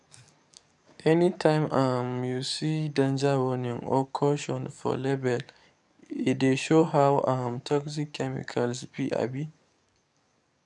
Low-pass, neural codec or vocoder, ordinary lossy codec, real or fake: 10.8 kHz; codec, 44.1 kHz, 7.8 kbps, DAC; none; fake